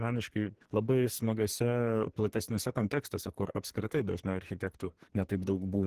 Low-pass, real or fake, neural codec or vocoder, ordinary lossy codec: 14.4 kHz; fake; codec, 44.1 kHz, 2.6 kbps, SNAC; Opus, 16 kbps